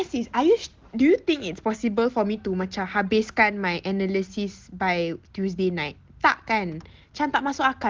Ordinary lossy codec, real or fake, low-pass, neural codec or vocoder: Opus, 16 kbps; real; 7.2 kHz; none